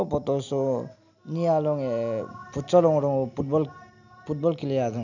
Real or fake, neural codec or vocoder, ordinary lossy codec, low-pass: real; none; none; 7.2 kHz